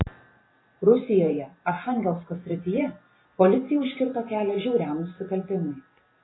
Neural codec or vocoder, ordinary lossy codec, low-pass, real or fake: vocoder, 44.1 kHz, 128 mel bands every 512 samples, BigVGAN v2; AAC, 16 kbps; 7.2 kHz; fake